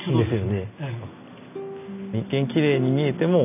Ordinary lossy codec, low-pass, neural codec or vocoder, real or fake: none; 3.6 kHz; none; real